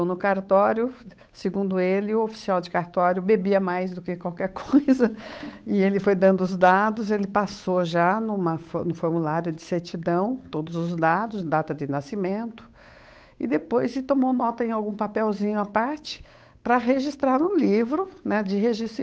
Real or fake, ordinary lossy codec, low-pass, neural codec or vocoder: fake; none; none; codec, 16 kHz, 8 kbps, FunCodec, trained on Chinese and English, 25 frames a second